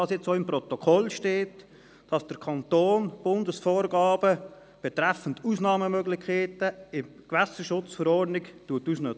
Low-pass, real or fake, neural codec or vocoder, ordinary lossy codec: none; real; none; none